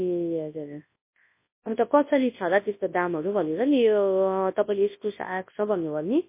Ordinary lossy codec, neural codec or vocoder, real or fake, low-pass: MP3, 24 kbps; codec, 24 kHz, 0.9 kbps, WavTokenizer, large speech release; fake; 3.6 kHz